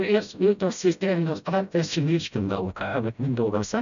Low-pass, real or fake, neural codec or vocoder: 7.2 kHz; fake; codec, 16 kHz, 0.5 kbps, FreqCodec, smaller model